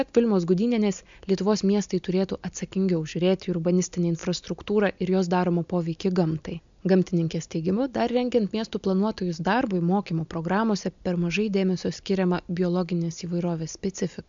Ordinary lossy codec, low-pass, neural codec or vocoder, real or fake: MP3, 64 kbps; 7.2 kHz; none; real